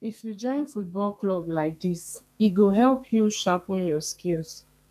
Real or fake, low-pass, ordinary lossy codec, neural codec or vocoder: fake; 14.4 kHz; none; codec, 32 kHz, 1.9 kbps, SNAC